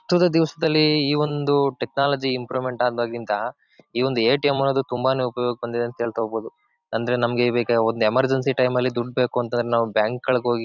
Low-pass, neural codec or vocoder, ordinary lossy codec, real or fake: 7.2 kHz; vocoder, 44.1 kHz, 128 mel bands every 256 samples, BigVGAN v2; none; fake